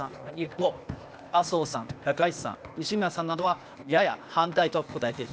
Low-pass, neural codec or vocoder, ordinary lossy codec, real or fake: none; codec, 16 kHz, 0.8 kbps, ZipCodec; none; fake